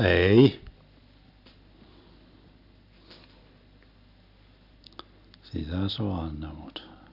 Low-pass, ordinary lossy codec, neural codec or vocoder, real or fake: 5.4 kHz; none; none; real